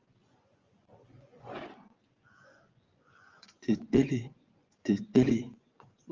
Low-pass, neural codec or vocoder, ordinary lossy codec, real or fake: 7.2 kHz; vocoder, 24 kHz, 100 mel bands, Vocos; Opus, 32 kbps; fake